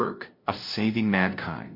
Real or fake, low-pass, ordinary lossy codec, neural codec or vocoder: fake; 5.4 kHz; MP3, 32 kbps; codec, 16 kHz, 0.5 kbps, FunCodec, trained on LibriTTS, 25 frames a second